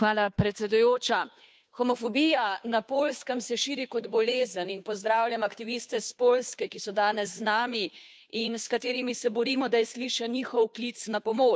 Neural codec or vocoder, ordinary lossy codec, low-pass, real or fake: codec, 16 kHz, 2 kbps, FunCodec, trained on Chinese and English, 25 frames a second; none; none; fake